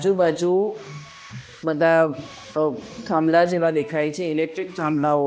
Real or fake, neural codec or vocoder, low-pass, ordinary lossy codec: fake; codec, 16 kHz, 1 kbps, X-Codec, HuBERT features, trained on balanced general audio; none; none